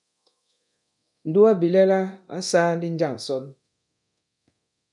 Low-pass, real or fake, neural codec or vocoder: 10.8 kHz; fake; codec, 24 kHz, 0.9 kbps, DualCodec